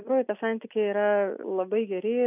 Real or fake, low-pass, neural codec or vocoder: real; 3.6 kHz; none